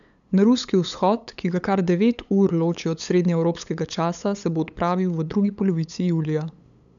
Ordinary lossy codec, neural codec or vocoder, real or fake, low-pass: none; codec, 16 kHz, 8 kbps, FunCodec, trained on LibriTTS, 25 frames a second; fake; 7.2 kHz